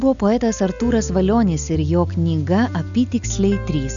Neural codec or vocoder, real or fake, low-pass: none; real; 7.2 kHz